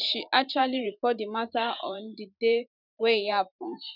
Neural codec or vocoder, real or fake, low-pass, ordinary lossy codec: vocoder, 44.1 kHz, 128 mel bands every 512 samples, BigVGAN v2; fake; 5.4 kHz; none